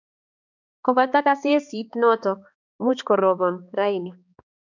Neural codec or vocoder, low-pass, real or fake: codec, 16 kHz, 2 kbps, X-Codec, HuBERT features, trained on balanced general audio; 7.2 kHz; fake